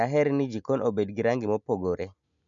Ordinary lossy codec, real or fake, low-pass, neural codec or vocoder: none; real; 7.2 kHz; none